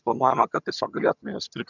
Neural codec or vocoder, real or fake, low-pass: vocoder, 22.05 kHz, 80 mel bands, HiFi-GAN; fake; 7.2 kHz